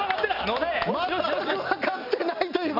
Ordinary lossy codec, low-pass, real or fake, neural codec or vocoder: none; 5.4 kHz; real; none